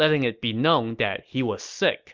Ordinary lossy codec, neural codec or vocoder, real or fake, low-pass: Opus, 24 kbps; none; real; 7.2 kHz